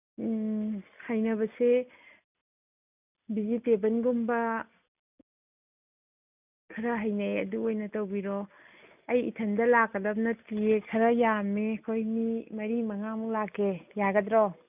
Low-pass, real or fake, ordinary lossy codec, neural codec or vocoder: 3.6 kHz; real; none; none